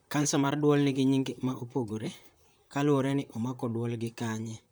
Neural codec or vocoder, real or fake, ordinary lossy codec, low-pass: vocoder, 44.1 kHz, 128 mel bands, Pupu-Vocoder; fake; none; none